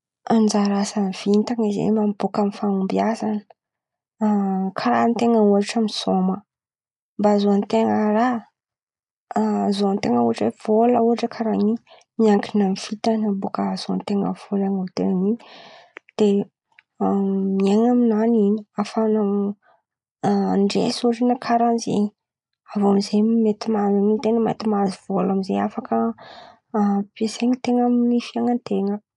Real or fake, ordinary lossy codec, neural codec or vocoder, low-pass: real; none; none; 10.8 kHz